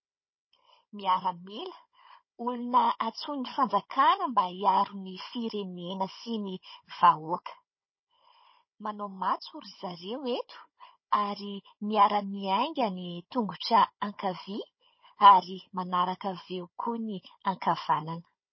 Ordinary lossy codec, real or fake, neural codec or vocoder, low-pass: MP3, 24 kbps; fake; codec, 16 kHz, 16 kbps, FunCodec, trained on Chinese and English, 50 frames a second; 7.2 kHz